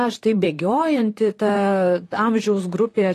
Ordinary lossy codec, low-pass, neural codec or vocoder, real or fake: AAC, 48 kbps; 14.4 kHz; vocoder, 44.1 kHz, 128 mel bands, Pupu-Vocoder; fake